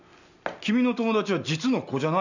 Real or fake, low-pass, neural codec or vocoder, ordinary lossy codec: real; 7.2 kHz; none; none